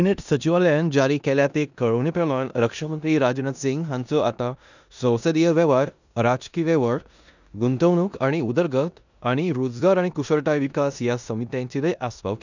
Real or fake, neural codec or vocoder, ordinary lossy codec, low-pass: fake; codec, 16 kHz in and 24 kHz out, 0.9 kbps, LongCat-Audio-Codec, four codebook decoder; none; 7.2 kHz